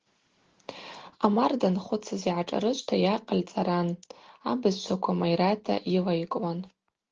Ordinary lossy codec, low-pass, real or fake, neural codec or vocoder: Opus, 16 kbps; 7.2 kHz; real; none